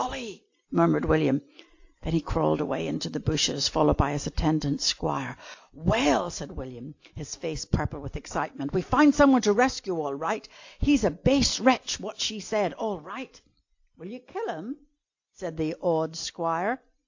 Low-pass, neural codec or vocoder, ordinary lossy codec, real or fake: 7.2 kHz; none; AAC, 48 kbps; real